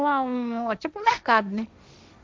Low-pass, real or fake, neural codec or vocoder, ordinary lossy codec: none; fake; codec, 16 kHz, 1.1 kbps, Voila-Tokenizer; none